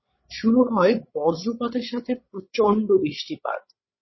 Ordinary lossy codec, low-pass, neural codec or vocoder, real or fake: MP3, 24 kbps; 7.2 kHz; codec, 16 kHz, 16 kbps, FreqCodec, larger model; fake